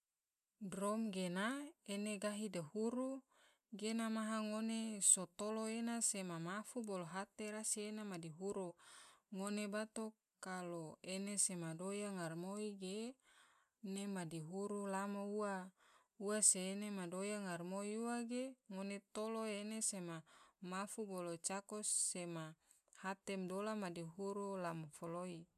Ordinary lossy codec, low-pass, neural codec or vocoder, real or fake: none; 14.4 kHz; none; real